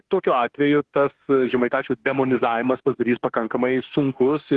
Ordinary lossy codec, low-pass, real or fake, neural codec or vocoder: Opus, 16 kbps; 10.8 kHz; fake; autoencoder, 48 kHz, 32 numbers a frame, DAC-VAE, trained on Japanese speech